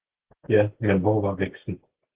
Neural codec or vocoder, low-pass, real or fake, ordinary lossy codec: none; 3.6 kHz; real; Opus, 16 kbps